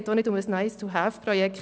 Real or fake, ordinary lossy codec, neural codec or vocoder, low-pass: real; none; none; none